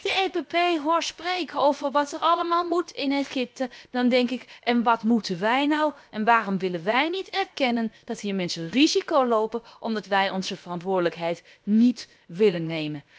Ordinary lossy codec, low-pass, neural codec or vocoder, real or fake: none; none; codec, 16 kHz, about 1 kbps, DyCAST, with the encoder's durations; fake